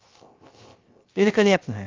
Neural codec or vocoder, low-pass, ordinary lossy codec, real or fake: codec, 16 kHz, 0.3 kbps, FocalCodec; 7.2 kHz; Opus, 32 kbps; fake